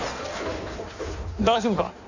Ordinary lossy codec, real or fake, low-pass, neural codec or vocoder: none; fake; 7.2 kHz; codec, 16 kHz in and 24 kHz out, 1.1 kbps, FireRedTTS-2 codec